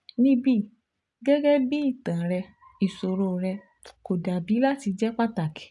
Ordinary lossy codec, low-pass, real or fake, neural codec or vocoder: none; 10.8 kHz; real; none